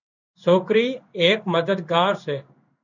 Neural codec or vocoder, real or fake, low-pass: codec, 16 kHz in and 24 kHz out, 1 kbps, XY-Tokenizer; fake; 7.2 kHz